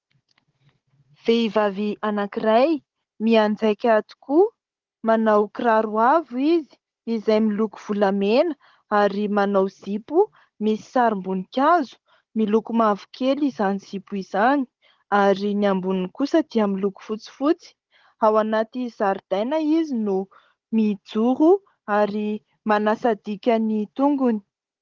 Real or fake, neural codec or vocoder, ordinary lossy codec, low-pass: fake; codec, 16 kHz, 16 kbps, FunCodec, trained on Chinese and English, 50 frames a second; Opus, 16 kbps; 7.2 kHz